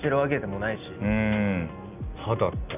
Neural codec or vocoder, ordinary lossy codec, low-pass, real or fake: none; none; 3.6 kHz; real